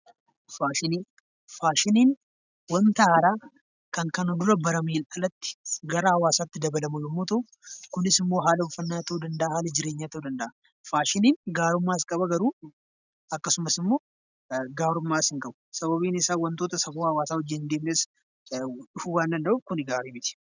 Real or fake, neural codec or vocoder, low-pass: real; none; 7.2 kHz